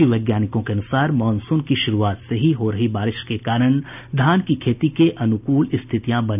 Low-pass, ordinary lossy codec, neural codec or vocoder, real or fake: 3.6 kHz; none; none; real